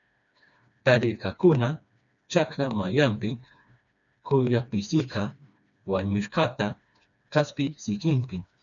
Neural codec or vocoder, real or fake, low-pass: codec, 16 kHz, 2 kbps, FreqCodec, smaller model; fake; 7.2 kHz